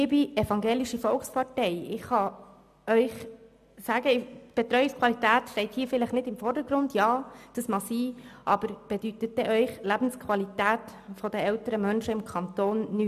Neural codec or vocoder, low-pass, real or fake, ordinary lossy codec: none; 14.4 kHz; real; MP3, 96 kbps